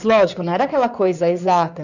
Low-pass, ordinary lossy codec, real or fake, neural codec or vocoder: 7.2 kHz; none; fake; codec, 16 kHz in and 24 kHz out, 2.2 kbps, FireRedTTS-2 codec